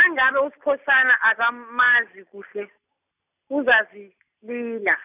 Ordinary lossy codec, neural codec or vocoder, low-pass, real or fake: none; none; 3.6 kHz; real